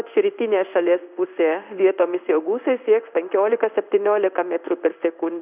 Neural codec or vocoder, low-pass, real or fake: codec, 16 kHz in and 24 kHz out, 1 kbps, XY-Tokenizer; 3.6 kHz; fake